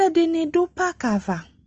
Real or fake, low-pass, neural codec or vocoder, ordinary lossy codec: real; 7.2 kHz; none; Opus, 24 kbps